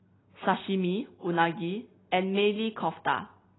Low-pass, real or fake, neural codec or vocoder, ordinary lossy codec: 7.2 kHz; real; none; AAC, 16 kbps